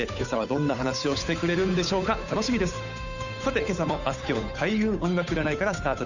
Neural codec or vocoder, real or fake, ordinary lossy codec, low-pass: codec, 16 kHz, 8 kbps, FunCodec, trained on Chinese and English, 25 frames a second; fake; none; 7.2 kHz